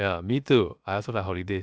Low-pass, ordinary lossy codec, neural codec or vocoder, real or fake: none; none; codec, 16 kHz, 0.7 kbps, FocalCodec; fake